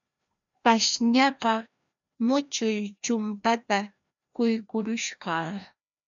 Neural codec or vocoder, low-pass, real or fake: codec, 16 kHz, 1 kbps, FreqCodec, larger model; 7.2 kHz; fake